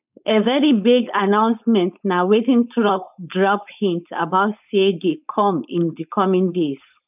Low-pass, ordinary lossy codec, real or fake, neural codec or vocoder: 3.6 kHz; none; fake; codec, 16 kHz, 4.8 kbps, FACodec